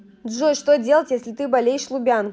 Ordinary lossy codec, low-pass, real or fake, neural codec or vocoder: none; none; real; none